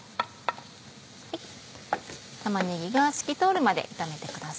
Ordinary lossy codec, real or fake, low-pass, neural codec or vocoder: none; real; none; none